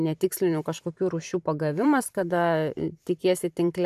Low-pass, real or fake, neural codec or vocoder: 14.4 kHz; fake; vocoder, 44.1 kHz, 128 mel bands every 512 samples, BigVGAN v2